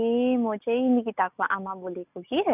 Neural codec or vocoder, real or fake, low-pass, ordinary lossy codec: none; real; 3.6 kHz; none